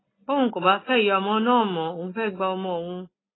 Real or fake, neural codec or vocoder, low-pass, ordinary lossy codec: real; none; 7.2 kHz; AAC, 16 kbps